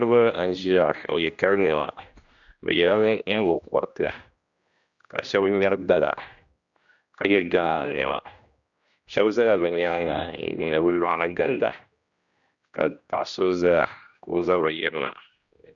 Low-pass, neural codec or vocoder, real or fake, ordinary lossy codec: 7.2 kHz; codec, 16 kHz, 1 kbps, X-Codec, HuBERT features, trained on general audio; fake; Opus, 64 kbps